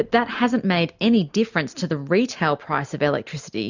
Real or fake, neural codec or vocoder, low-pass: real; none; 7.2 kHz